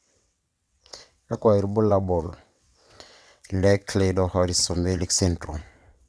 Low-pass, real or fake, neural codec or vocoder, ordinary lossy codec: none; fake; vocoder, 22.05 kHz, 80 mel bands, WaveNeXt; none